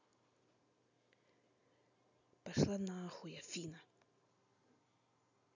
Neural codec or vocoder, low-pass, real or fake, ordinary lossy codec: none; 7.2 kHz; real; none